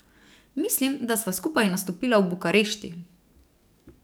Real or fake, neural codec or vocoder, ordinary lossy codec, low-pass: fake; codec, 44.1 kHz, 7.8 kbps, DAC; none; none